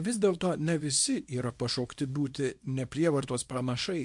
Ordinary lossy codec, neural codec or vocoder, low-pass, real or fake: MP3, 64 kbps; codec, 24 kHz, 0.9 kbps, WavTokenizer, small release; 10.8 kHz; fake